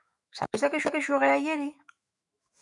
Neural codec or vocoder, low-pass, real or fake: autoencoder, 48 kHz, 128 numbers a frame, DAC-VAE, trained on Japanese speech; 10.8 kHz; fake